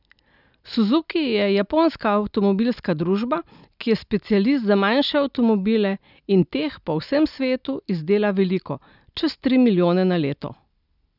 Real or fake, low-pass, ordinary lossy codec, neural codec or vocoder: real; 5.4 kHz; none; none